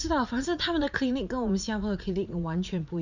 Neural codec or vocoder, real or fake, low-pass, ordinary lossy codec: vocoder, 44.1 kHz, 80 mel bands, Vocos; fake; 7.2 kHz; none